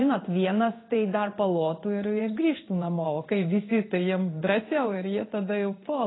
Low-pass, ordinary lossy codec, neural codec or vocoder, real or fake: 7.2 kHz; AAC, 16 kbps; codec, 16 kHz in and 24 kHz out, 1 kbps, XY-Tokenizer; fake